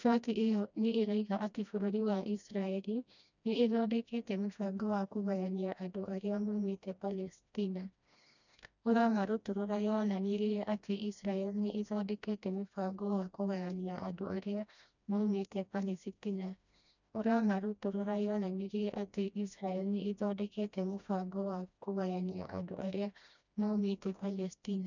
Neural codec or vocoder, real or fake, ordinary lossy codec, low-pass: codec, 16 kHz, 1 kbps, FreqCodec, smaller model; fake; none; 7.2 kHz